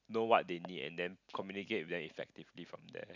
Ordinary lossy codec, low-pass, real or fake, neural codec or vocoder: none; 7.2 kHz; real; none